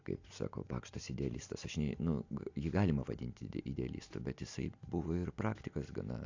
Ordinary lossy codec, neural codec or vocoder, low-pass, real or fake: AAC, 48 kbps; none; 7.2 kHz; real